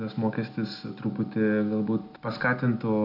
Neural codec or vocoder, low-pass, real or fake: none; 5.4 kHz; real